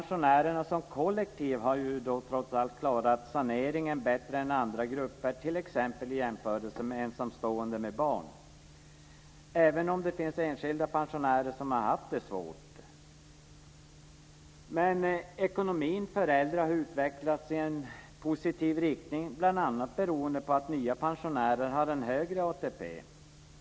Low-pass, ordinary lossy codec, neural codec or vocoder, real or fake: none; none; none; real